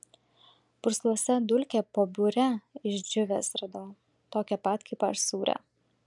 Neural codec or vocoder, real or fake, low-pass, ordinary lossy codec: none; real; 10.8 kHz; MP3, 96 kbps